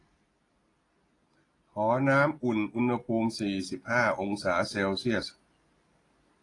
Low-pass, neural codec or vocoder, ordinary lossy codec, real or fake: 10.8 kHz; none; AAC, 32 kbps; real